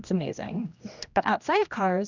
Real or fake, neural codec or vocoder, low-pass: fake; codec, 16 kHz, 1 kbps, X-Codec, HuBERT features, trained on general audio; 7.2 kHz